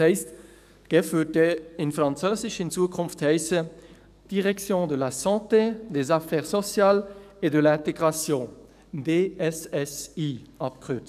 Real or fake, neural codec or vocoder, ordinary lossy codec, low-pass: fake; codec, 44.1 kHz, 7.8 kbps, DAC; none; 14.4 kHz